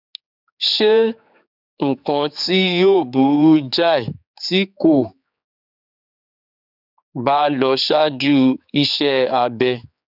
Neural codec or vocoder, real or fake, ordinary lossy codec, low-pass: codec, 16 kHz, 4 kbps, X-Codec, HuBERT features, trained on general audio; fake; none; 5.4 kHz